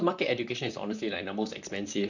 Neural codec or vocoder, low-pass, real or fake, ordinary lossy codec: vocoder, 44.1 kHz, 128 mel bands, Pupu-Vocoder; 7.2 kHz; fake; none